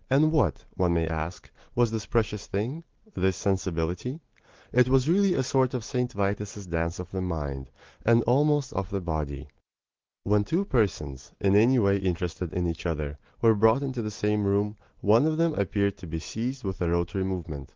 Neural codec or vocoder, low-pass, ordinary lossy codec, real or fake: none; 7.2 kHz; Opus, 16 kbps; real